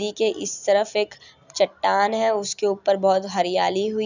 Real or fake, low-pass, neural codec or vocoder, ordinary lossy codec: real; 7.2 kHz; none; none